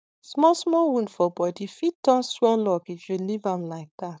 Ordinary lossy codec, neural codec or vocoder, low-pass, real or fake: none; codec, 16 kHz, 4.8 kbps, FACodec; none; fake